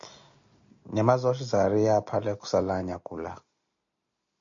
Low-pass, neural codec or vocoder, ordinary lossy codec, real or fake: 7.2 kHz; none; MP3, 64 kbps; real